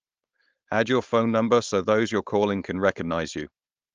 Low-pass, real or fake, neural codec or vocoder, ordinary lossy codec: 7.2 kHz; fake; codec, 16 kHz, 4.8 kbps, FACodec; Opus, 24 kbps